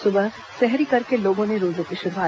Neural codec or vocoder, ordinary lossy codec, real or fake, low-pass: none; none; real; none